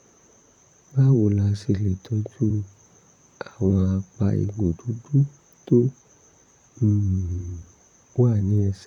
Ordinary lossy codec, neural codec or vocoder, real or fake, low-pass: none; vocoder, 44.1 kHz, 128 mel bands, Pupu-Vocoder; fake; 19.8 kHz